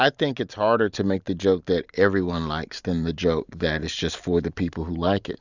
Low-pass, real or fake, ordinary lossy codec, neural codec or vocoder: 7.2 kHz; fake; Opus, 64 kbps; vocoder, 44.1 kHz, 128 mel bands every 512 samples, BigVGAN v2